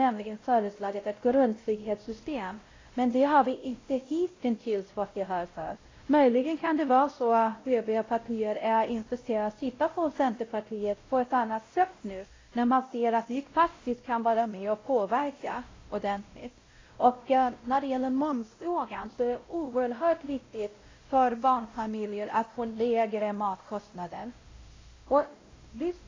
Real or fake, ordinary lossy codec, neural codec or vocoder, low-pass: fake; AAC, 32 kbps; codec, 16 kHz, 0.5 kbps, X-Codec, WavLM features, trained on Multilingual LibriSpeech; 7.2 kHz